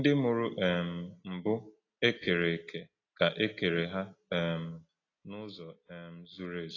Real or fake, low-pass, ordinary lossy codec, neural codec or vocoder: real; 7.2 kHz; AAC, 32 kbps; none